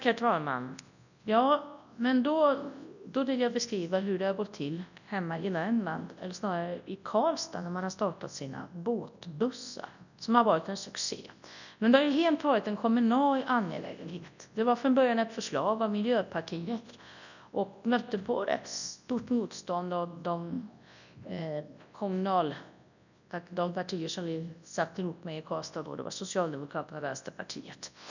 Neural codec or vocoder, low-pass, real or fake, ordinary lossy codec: codec, 24 kHz, 0.9 kbps, WavTokenizer, large speech release; 7.2 kHz; fake; none